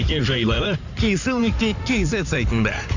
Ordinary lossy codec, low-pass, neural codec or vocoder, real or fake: none; 7.2 kHz; codec, 16 kHz in and 24 kHz out, 2.2 kbps, FireRedTTS-2 codec; fake